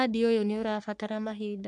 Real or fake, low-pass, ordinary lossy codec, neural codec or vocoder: fake; 10.8 kHz; none; codec, 44.1 kHz, 3.4 kbps, Pupu-Codec